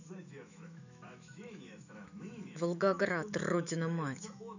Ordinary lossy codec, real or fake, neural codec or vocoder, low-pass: none; fake; autoencoder, 48 kHz, 128 numbers a frame, DAC-VAE, trained on Japanese speech; 7.2 kHz